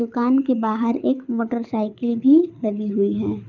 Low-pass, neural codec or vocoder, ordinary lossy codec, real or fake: 7.2 kHz; codec, 24 kHz, 6 kbps, HILCodec; none; fake